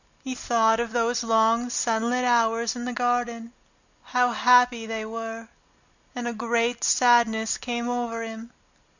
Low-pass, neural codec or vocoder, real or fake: 7.2 kHz; none; real